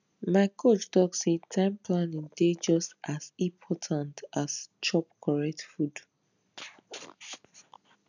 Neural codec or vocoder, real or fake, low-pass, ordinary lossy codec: none; real; 7.2 kHz; none